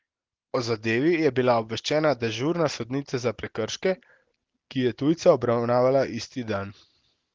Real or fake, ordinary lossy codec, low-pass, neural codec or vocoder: real; Opus, 16 kbps; 7.2 kHz; none